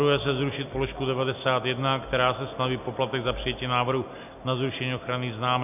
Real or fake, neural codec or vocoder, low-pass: real; none; 3.6 kHz